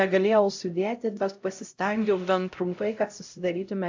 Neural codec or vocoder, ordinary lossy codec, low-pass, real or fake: codec, 16 kHz, 0.5 kbps, X-Codec, HuBERT features, trained on LibriSpeech; AAC, 48 kbps; 7.2 kHz; fake